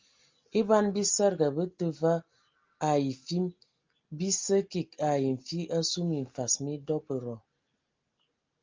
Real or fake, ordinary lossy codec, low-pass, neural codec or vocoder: real; Opus, 32 kbps; 7.2 kHz; none